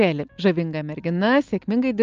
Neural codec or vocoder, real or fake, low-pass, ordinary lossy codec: none; real; 7.2 kHz; Opus, 24 kbps